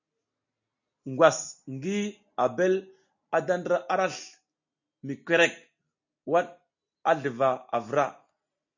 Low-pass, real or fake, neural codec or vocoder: 7.2 kHz; real; none